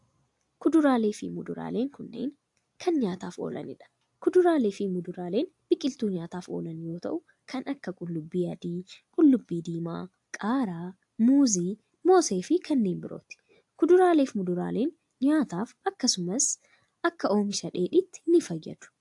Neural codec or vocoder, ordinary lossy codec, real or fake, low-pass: none; AAC, 64 kbps; real; 10.8 kHz